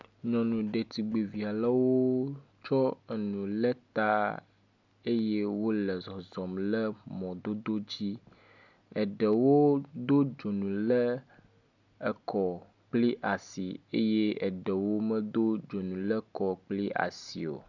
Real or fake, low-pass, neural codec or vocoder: real; 7.2 kHz; none